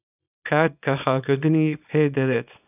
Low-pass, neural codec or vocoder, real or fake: 3.6 kHz; codec, 24 kHz, 0.9 kbps, WavTokenizer, small release; fake